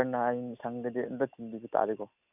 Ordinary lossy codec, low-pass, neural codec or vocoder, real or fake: none; 3.6 kHz; none; real